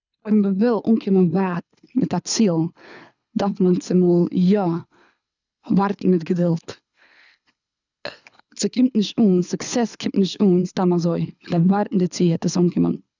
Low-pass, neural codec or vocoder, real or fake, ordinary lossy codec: 7.2 kHz; codec, 24 kHz, 6 kbps, HILCodec; fake; none